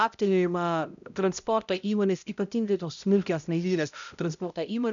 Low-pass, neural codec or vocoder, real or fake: 7.2 kHz; codec, 16 kHz, 0.5 kbps, X-Codec, HuBERT features, trained on balanced general audio; fake